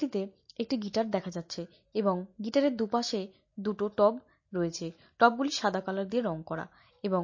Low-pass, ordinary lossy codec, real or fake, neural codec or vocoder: 7.2 kHz; MP3, 32 kbps; real; none